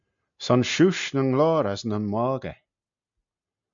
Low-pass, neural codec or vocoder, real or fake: 7.2 kHz; none; real